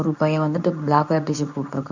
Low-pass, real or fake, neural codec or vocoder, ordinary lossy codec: 7.2 kHz; fake; codec, 24 kHz, 0.9 kbps, WavTokenizer, medium speech release version 2; none